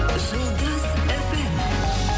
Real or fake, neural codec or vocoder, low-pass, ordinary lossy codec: real; none; none; none